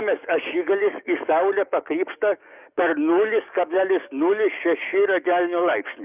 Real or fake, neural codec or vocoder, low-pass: fake; codec, 44.1 kHz, 7.8 kbps, DAC; 3.6 kHz